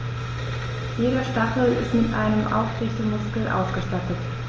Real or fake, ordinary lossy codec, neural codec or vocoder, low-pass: real; Opus, 24 kbps; none; 7.2 kHz